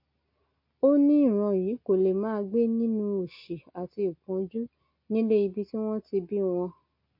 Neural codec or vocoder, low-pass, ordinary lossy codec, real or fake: none; 5.4 kHz; MP3, 32 kbps; real